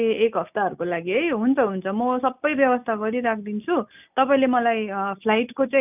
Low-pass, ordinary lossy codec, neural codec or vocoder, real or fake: 3.6 kHz; none; none; real